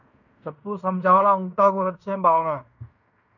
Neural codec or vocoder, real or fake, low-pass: codec, 16 kHz in and 24 kHz out, 0.9 kbps, LongCat-Audio-Codec, fine tuned four codebook decoder; fake; 7.2 kHz